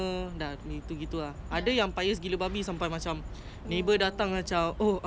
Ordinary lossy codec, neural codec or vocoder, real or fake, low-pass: none; none; real; none